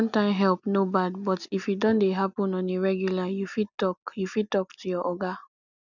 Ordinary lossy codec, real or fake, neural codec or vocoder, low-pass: none; real; none; 7.2 kHz